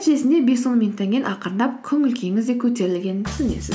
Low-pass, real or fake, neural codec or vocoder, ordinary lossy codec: none; real; none; none